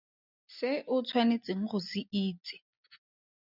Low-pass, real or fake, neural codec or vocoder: 5.4 kHz; real; none